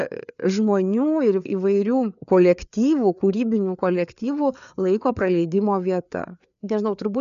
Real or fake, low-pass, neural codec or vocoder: fake; 7.2 kHz; codec, 16 kHz, 4 kbps, FreqCodec, larger model